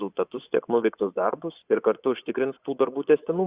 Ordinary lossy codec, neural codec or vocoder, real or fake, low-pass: Opus, 64 kbps; vocoder, 24 kHz, 100 mel bands, Vocos; fake; 3.6 kHz